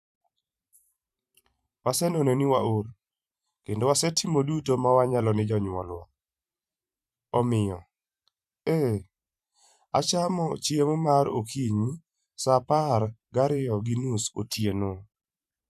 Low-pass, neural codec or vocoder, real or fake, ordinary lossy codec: 14.4 kHz; vocoder, 44.1 kHz, 128 mel bands every 512 samples, BigVGAN v2; fake; none